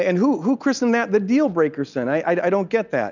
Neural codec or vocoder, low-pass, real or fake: none; 7.2 kHz; real